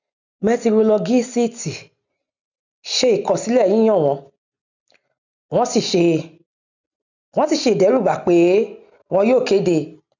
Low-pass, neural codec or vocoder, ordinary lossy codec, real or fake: 7.2 kHz; none; none; real